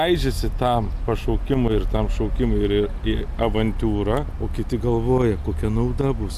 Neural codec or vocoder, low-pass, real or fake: none; 14.4 kHz; real